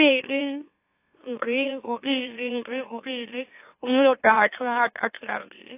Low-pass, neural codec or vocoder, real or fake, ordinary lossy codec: 3.6 kHz; autoencoder, 44.1 kHz, a latent of 192 numbers a frame, MeloTTS; fake; AAC, 24 kbps